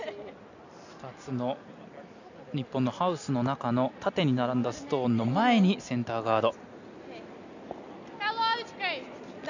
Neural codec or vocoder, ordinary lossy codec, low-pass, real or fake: none; none; 7.2 kHz; real